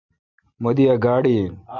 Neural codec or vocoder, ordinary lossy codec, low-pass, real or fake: vocoder, 44.1 kHz, 128 mel bands every 256 samples, BigVGAN v2; MP3, 64 kbps; 7.2 kHz; fake